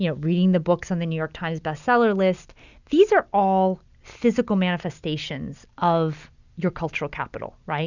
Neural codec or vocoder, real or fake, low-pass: none; real; 7.2 kHz